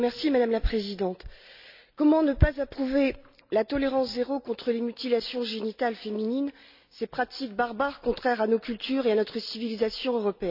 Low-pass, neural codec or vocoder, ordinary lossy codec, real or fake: 5.4 kHz; none; none; real